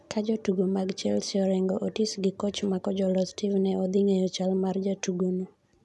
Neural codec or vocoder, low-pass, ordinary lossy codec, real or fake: none; none; none; real